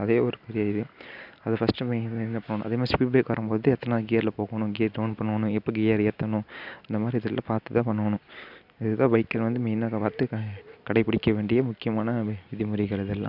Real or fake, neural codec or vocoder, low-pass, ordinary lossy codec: real; none; 5.4 kHz; none